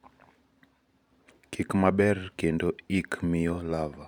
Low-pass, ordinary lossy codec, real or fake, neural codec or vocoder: 19.8 kHz; none; fake; vocoder, 44.1 kHz, 128 mel bands every 512 samples, BigVGAN v2